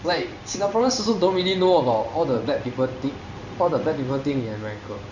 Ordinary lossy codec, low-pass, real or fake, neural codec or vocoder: none; 7.2 kHz; fake; codec, 16 kHz in and 24 kHz out, 1 kbps, XY-Tokenizer